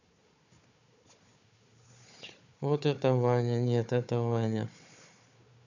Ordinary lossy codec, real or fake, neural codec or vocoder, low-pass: none; fake; codec, 16 kHz, 4 kbps, FunCodec, trained on Chinese and English, 50 frames a second; 7.2 kHz